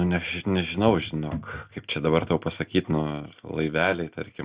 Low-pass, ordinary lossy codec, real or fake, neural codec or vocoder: 3.6 kHz; Opus, 64 kbps; real; none